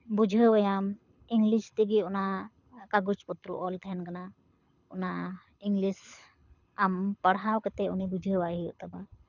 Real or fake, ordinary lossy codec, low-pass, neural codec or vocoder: fake; none; 7.2 kHz; codec, 24 kHz, 6 kbps, HILCodec